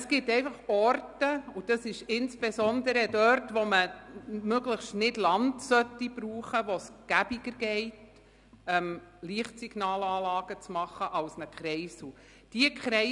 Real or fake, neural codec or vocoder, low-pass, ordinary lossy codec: real; none; 10.8 kHz; none